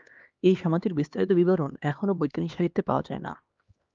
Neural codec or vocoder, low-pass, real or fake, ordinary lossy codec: codec, 16 kHz, 2 kbps, X-Codec, HuBERT features, trained on LibriSpeech; 7.2 kHz; fake; Opus, 32 kbps